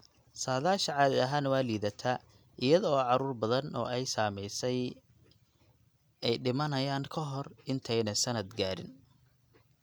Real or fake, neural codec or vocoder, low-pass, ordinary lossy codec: real; none; none; none